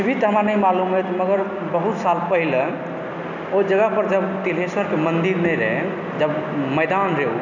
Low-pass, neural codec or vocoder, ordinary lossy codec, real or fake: 7.2 kHz; none; none; real